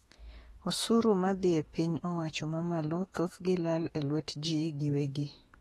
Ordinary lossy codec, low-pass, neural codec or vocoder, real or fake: AAC, 32 kbps; 19.8 kHz; autoencoder, 48 kHz, 32 numbers a frame, DAC-VAE, trained on Japanese speech; fake